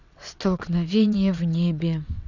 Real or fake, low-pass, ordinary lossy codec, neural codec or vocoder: fake; 7.2 kHz; none; vocoder, 22.05 kHz, 80 mel bands, WaveNeXt